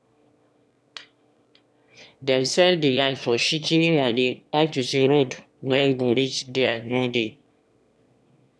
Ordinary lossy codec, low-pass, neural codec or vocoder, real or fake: none; none; autoencoder, 22.05 kHz, a latent of 192 numbers a frame, VITS, trained on one speaker; fake